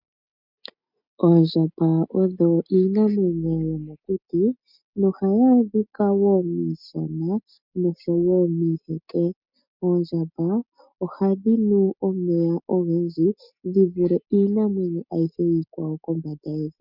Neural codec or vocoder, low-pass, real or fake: none; 5.4 kHz; real